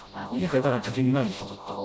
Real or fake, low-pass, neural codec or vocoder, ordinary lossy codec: fake; none; codec, 16 kHz, 0.5 kbps, FreqCodec, smaller model; none